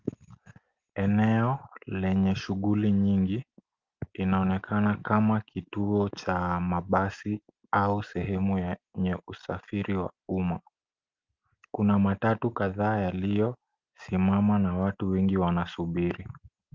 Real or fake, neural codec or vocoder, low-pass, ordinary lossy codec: real; none; 7.2 kHz; Opus, 32 kbps